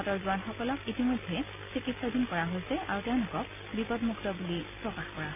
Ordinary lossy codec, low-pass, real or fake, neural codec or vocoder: none; 3.6 kHz; real; none